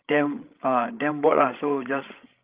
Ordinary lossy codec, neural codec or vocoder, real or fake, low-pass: Opus, 32 kbps; codec, 16 kHz, 16 kbps, FreqCodec, larger model; fake; 3.6 kHz